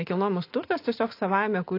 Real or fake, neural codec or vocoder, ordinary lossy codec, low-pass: real; none; AAC, 32 kbps; 5.4 kHz